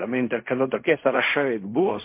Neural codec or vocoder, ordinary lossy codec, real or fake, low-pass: codec, 16 kHz in and 24 kHz out, 0.4 kbps, LongCat-Audio-Codec, fine tuned four codebook decoder; MP3, 24 kbps; fake; 3.6 kHz